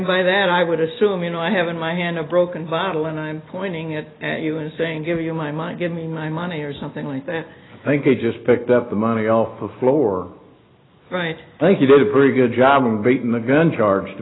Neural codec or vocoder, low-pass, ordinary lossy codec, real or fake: none; 7.2 kHz; AAC, 16 kbps; real